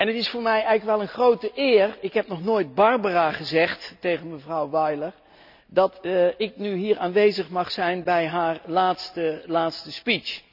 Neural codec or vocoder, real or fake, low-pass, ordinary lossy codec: none; real; 5.4 kHz; none